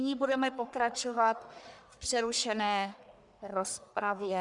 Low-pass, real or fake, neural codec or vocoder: 10.8 kHz; fake; codec, 44.1 kHz, 1.7 kbps, Pupu-Codec